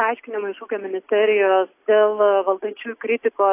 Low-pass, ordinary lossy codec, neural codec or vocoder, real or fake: 3.6 kHz; AAC, 24 kbps; none; real